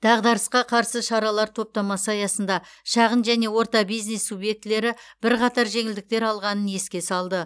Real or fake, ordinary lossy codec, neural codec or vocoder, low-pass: real; none; none; none